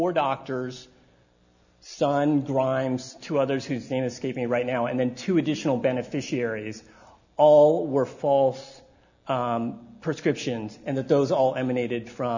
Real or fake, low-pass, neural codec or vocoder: real; 7.2 kHz; none